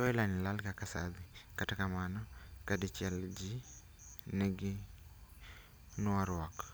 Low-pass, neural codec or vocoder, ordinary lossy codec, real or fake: none; none; none; real